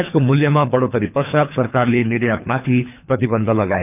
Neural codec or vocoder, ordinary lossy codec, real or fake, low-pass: codec, 24 kHz, 3 kbps, HILCodec; none; fake; 3.6 kHz